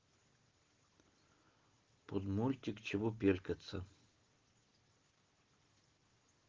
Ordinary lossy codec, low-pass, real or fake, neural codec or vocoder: Opus, 16 kbps; 7.2 kHz; real; none